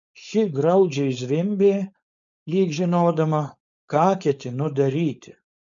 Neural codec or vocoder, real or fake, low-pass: codec, 16 kHz, 4.8 kbps, FACodec; fake; 7.2 kHz